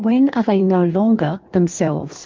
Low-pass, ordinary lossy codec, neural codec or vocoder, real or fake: 7.2 kHz; Opus, 32 kbps; codec, 16 kHz in and 24 kHz out, 1.1 kbps, FireRedTTS-2 codec; fake